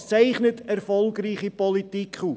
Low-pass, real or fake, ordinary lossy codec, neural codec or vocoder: none; real; none; none